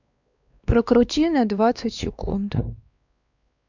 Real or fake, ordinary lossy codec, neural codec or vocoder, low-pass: fake; none; codec, 16 kHz, 2 kbps, X-Codec, WavLM features, trained on Multilingual LibriSpeech; 7.2 kHz